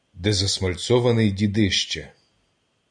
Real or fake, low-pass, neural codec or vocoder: real; 9.9 kHz; none